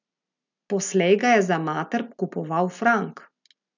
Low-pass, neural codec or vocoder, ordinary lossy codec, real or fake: 7.2 kHz; none; none; real